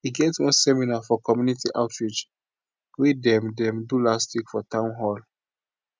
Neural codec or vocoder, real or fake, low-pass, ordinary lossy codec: none; real; none; none